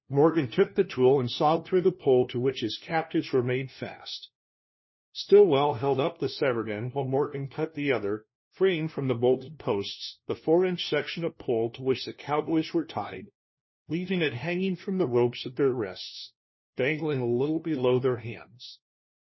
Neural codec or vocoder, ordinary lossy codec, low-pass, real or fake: codec, 16 kHz, 1 kbps, FunCodec, trained on LibriTTS, 50 frames a second; MP3, 24 kbps; 7.2 kHz; fake